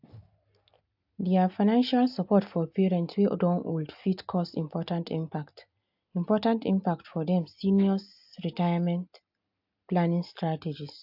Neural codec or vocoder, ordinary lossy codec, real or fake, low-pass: none; AAC, 48 kbps; real; 5.4 kHz